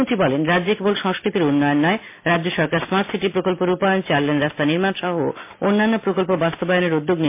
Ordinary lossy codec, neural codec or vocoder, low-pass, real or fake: MP3, 24 kbps; none; 3.6 kHz; real